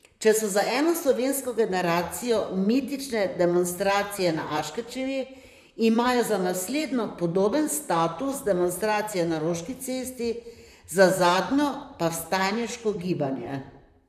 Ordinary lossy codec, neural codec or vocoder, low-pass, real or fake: none; vocoder, 44.1 kHz, 128 mel bands, Pupu-Vocoder; 14.4 kHz; fake